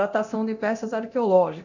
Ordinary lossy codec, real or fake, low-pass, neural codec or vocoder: none; fake; 7.2 kHz; codec, 24 kHz, 0.9 kbps, DualCodec